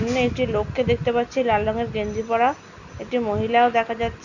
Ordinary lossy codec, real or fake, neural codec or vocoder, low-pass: none; real; none; 7.2 kHz